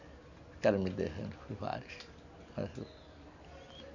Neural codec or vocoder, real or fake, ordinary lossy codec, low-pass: none; real; none; 7.2 kHz